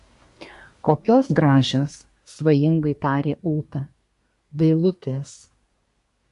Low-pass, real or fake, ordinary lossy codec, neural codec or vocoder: 10.8 kHz; fake; MP3, 64 kbps; codec, 24 kHz, 1 kbps, SNAC